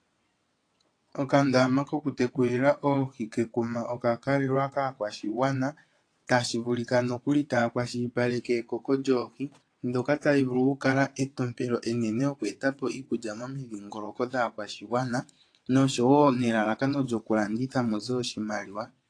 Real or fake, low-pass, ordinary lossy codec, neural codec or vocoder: fake; 9.9 kHz; AAC, 48 kbps; vocoder, 22.05 kHz, 80 mel bands, WaveNeXt